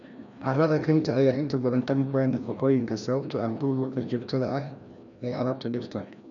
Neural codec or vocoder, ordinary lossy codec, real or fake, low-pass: codec, 16 kHz, 1 kbps, FreqCodec, larger model; none; fake; 7.2 kHz